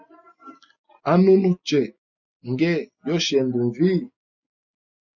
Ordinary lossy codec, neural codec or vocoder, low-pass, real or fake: MP3, 64 kbps; none; 7.2 kHz; real